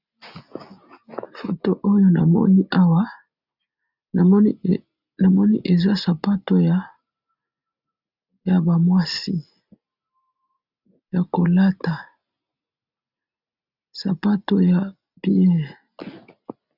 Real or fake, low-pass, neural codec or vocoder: real; 5.4 kHz; none